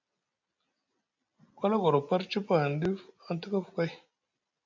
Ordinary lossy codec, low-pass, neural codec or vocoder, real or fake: MP3, 64 kbps; 7.2 kHz; none; real